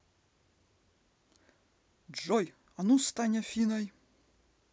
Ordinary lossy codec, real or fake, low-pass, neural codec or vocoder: none; real; none; none